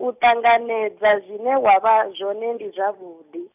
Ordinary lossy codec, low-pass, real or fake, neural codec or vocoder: none; 3.6 kHz; real; none